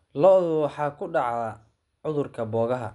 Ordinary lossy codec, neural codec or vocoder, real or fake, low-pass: none; none; real; 10.8 kHz